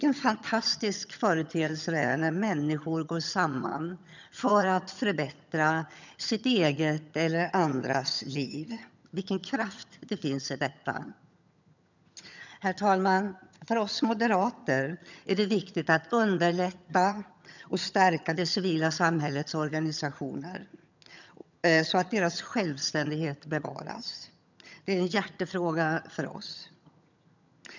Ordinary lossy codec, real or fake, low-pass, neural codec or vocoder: none; fake; 7.2 kHz; vocoder, 22.05 kHz, 80 mel bands, HiFi-GAN